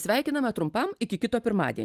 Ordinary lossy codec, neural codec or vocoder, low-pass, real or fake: Opus, 32 kbps; none; 14.4 kHz; real